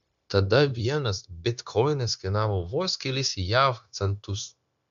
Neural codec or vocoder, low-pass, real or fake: codec, 16 kHz, 0.9 kbps, LongCat-Audio-Codec; 7.2 kHz; fake